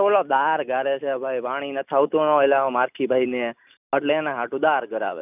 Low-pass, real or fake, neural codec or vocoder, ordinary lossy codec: 3.6 kHz; real; none; none